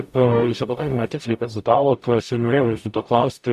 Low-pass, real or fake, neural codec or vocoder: 14.4 kHz; fake; codec, 44.1 kHz, 0.9 kbps, DAC